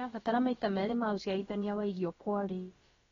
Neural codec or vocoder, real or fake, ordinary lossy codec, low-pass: codec, 16 kHz, about 1 kbps, DyCAST, with the encoder's durations; fake; AAC, 24 kbps; 7.2 kHz